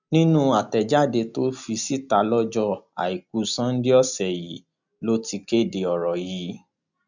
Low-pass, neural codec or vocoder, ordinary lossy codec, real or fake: 7.2 kHz; none; none; real